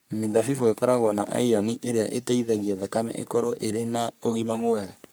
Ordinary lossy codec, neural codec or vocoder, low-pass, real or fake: none; codec, 44.1 kHz, 3.4 kbps, Pupu-Codec; none; fake